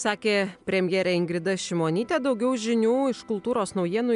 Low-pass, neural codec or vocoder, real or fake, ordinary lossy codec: 10.8 kHz; none; real; MP3, 96 kbps